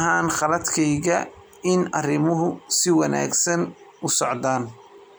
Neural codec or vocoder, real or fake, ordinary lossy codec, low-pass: none; real; none; none